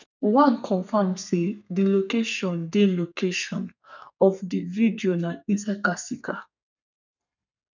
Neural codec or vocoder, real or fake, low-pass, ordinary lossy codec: codec, 32 kHz, 1.9 kbps, SNAC; fake; 7.2 kHz; none